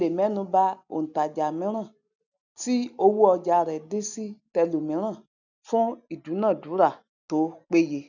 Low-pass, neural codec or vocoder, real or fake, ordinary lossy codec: 7.2 kHz; none; real; none